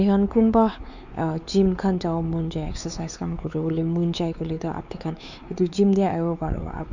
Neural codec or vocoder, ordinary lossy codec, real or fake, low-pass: codec, 16 kHz, 4 kbps, X-Codec, WavLM features, trained on Multilingual LibriSpeech; none; fake; 7.2 kHz